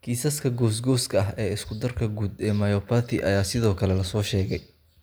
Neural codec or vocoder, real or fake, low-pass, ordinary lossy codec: none; real; none; none